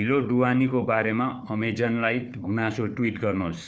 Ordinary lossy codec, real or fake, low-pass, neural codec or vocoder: none; fake; none; codec, 16 kHz, 4 kbps, FunCodec, trained on Chinese and English, 50 frames a second